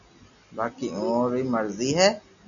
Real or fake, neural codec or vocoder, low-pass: real; none; 7.2 kHz